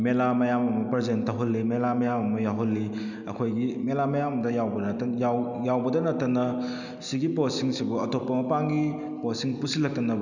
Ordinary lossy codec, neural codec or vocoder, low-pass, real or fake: none; none; 7.2 kHz; real